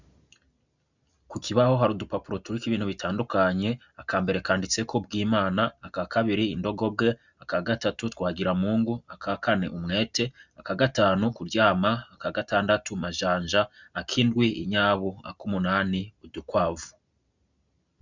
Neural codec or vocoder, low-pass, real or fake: none; 7.2 kHz; real